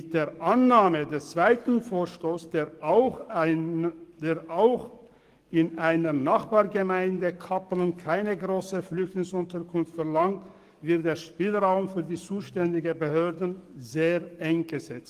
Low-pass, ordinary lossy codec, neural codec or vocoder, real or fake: 14.4 kHz; Opus, 24 kbps; codec, 44.1 kHz, 7.8 kbps, DAC; fake